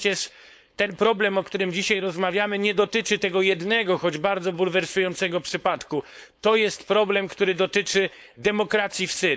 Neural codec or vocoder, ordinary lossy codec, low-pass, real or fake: codec, 16 kHz, 4.8 kbps, FACodec; none; none; fake